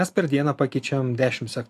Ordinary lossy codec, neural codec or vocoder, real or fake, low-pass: AAC, 64 kbps; none; real; 14.4 kHz